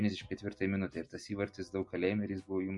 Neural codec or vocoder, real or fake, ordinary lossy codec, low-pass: none; real; MP3, 48 kbps; 10.8 kHz